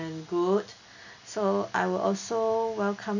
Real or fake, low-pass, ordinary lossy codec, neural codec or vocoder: real; 7.2 kHz; none; none